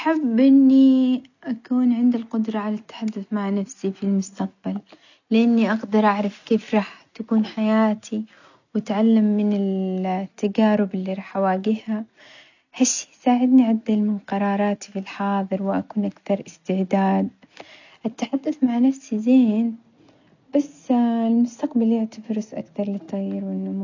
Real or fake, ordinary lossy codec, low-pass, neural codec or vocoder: real; none; 7.2 kHz; none